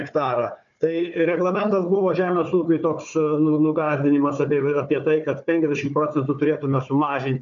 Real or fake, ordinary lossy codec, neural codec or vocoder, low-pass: fake; MP3, 96 kbps; codec, 16 kHz, 4 kbps, FunCodec, trained on Chinese and English, 50 frames a second; 7.2 kHz